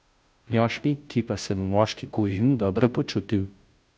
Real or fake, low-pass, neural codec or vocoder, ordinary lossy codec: fake; none; codec, 16 kHz, 0.5 kbps, FunCodec, trained on Chinese and English, 25 frames a second; none